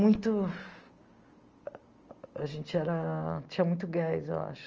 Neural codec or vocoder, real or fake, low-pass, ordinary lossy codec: none; real; 7.2 kHz; Opus, 24 kbps